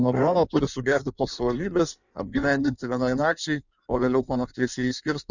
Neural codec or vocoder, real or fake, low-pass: codec, 16 kHz in and 24 kHz out, 1.1 kbps, FireRedTTS-2 codec; fake; 7.2 kHz